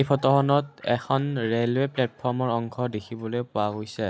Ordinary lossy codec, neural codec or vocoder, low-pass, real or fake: none; none; none; real